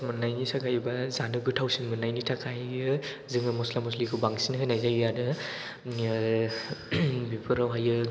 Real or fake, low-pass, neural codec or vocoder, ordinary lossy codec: real; none; none; none